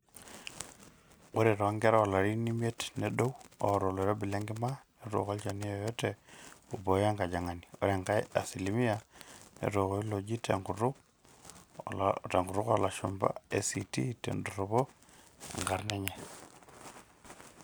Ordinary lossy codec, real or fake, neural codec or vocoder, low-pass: none; real; none; none